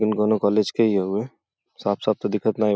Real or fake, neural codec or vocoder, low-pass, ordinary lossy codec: real; none; none; none